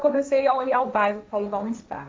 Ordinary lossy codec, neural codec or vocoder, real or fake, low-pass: none; codec, 16 kHz, 1.1 kbps, Voila-Tokenizer; fake; none